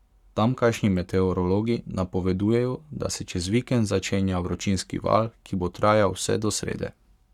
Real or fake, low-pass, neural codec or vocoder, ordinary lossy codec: fake; 19.8 kHz; codec, 44.1 kHz, 7.8 kbps, Pupu-Codec; none